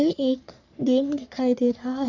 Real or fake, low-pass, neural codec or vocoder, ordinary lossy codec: fake; 7.2 kHz; codec, 44.1 kHz, 3.4 kbps, Pupu-Codec; none